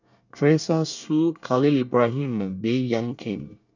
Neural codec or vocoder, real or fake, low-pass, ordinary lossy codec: codec, 24 kHz, 1 kbps, SNAC; fake; 7.2 kHz; none